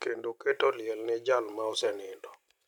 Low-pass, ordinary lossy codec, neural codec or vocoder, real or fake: 19.8 kHz; none; none; real